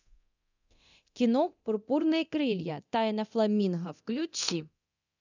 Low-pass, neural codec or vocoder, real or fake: 7.2 kHz; codec, 24 kHz, 0.9 kbps, DualCodec; fake